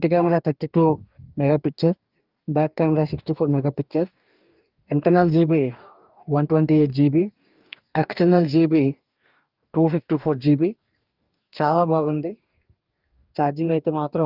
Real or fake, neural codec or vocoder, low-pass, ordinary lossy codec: fake; codec, 44.1 kHz, 2.6 kbps, DAC; 5.4 kHz; Opus, 24 kbps